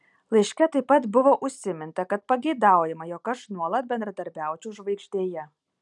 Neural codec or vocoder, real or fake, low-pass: none; real; 10.8 kHz